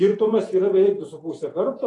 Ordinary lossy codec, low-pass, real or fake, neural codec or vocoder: AAC, 32 kbps; 10.8 kHz; fake; vocoder, 48 kHz, 128 mel bands, Vocos